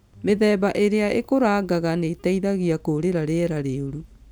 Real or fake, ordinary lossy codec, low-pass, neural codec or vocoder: real; none; none; none